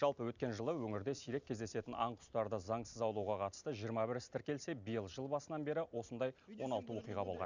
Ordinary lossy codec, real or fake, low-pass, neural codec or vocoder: none; real; 7.2 kHz; none